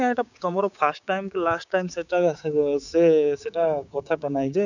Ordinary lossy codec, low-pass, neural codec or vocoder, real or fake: none; 7.2 kHz; codec, 16 kHz, 6 kbps, DAC; fake